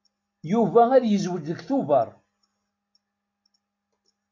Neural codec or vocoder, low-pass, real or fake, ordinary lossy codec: none; 7.2 kHz; real; MP3, 48 kbps